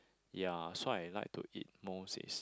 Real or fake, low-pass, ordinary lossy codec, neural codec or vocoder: real; none; none; none